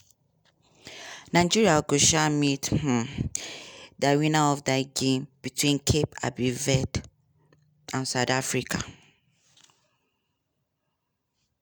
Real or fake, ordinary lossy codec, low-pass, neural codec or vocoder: real; none; none; none